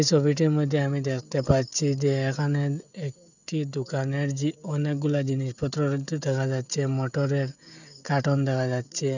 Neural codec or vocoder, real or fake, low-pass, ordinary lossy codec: codec, 16 kHz, 16 kbps, FreqCodec, smaller model; fake; 7.2 kHz; none